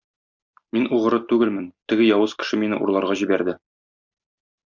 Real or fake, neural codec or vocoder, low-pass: real; none; 7.2 kHz